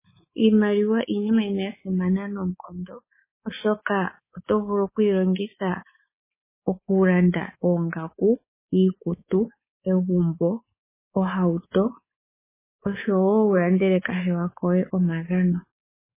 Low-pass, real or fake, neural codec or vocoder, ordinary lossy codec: 3.6 kHz; fake; codec, 24 kHz, 3.1 kbps, DualCodec; MP3, 16 kbps